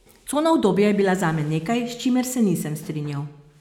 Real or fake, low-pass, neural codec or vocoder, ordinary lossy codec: real; 19.8 kHz; none; none